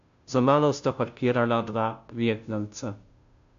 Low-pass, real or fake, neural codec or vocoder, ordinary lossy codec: 7.2 kHz; fake; codec, 16 kHz, 0.5 kbps, FunCodec, trained on Chinese and English, 25 frames a second; MP3, 64 kbps